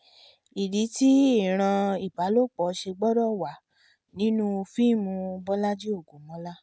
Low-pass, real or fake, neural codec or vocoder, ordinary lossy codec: none; real; none; none